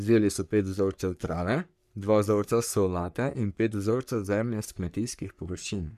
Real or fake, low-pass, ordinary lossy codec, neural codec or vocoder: fake; 14.4 kHz; none; codec, 44.1 kHz, 3.4 kbps, Pupu-Codec